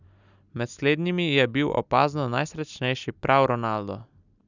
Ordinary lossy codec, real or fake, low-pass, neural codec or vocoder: none; real; 7.2 kHz; none